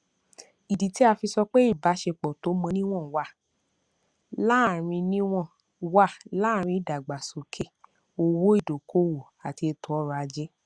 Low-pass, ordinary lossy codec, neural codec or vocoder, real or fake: 9.9 kHz; Opus, 64 kbps; none; real